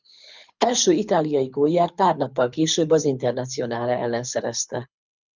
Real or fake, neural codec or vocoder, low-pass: fake; codec, 24 kHz, 6 kbps, HILCodec; 7.2 kHz